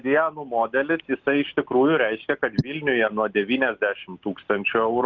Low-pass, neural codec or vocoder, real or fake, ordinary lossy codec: 7.2 kHz; none; real; Opus, 24 kbps